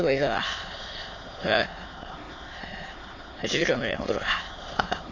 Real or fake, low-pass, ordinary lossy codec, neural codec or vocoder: fake; 7.2 kHz; AAC, 32 kbps; autoencoder, 22.05 kHz, a latent of 192 numbers a frame, VITS, trained on many speakers